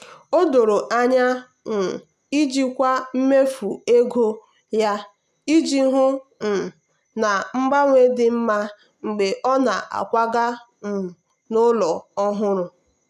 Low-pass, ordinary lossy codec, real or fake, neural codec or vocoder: 14.4 kHz; none; real; none